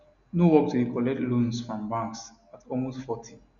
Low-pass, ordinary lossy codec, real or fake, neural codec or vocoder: 7.2 kHz; none; real; none